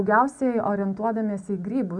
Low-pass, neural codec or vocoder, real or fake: 10.8 kHz; none; real